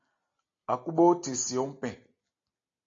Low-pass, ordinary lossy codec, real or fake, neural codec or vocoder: 7.2 kHz; AAC, 32 kbps; real; none